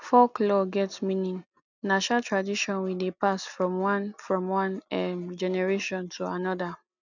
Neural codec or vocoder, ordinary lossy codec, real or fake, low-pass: none; none; real; 7.2 kHz